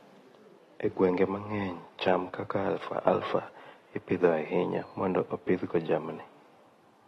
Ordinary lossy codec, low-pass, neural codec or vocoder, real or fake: AAC, 32 kbps; 19.8 kHz; none; real